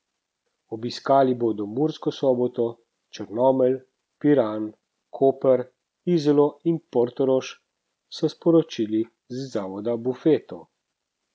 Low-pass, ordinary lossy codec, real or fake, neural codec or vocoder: none; none; real; none